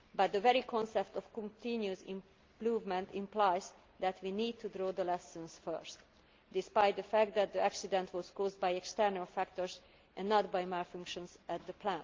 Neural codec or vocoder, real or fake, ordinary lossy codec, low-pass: none; real; Opus, 32 kbps; 7.2 kHz